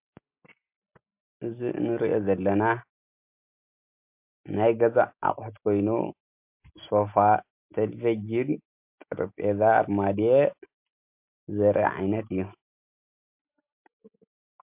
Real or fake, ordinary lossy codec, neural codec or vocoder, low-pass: real; MP3, 32 kbps; none; 3.6 kHz